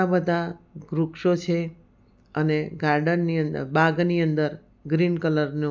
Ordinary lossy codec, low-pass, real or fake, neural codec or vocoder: none; none; real; none